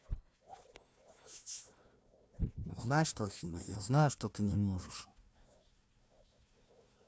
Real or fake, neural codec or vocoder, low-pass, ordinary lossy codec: fake; codec, 16 kHz, 1 kbps, FunCodec, trained on Chinese and English, 50 frames a second; none; none